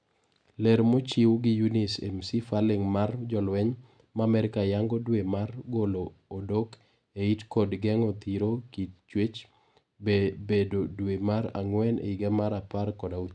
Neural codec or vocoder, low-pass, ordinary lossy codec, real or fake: none; 9.9 kHz; none; real